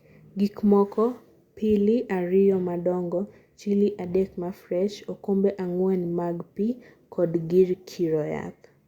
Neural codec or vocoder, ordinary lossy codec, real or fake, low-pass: none; Opus, 64 kbps; real; 19.8 kHz